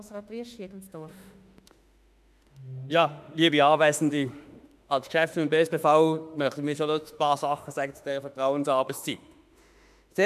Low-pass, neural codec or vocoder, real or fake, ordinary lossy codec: 14.4 kHz; autoencoder, 48 kHz, 32 numbers a frame, DAC-VAE, trained on Japanese speech; fake; none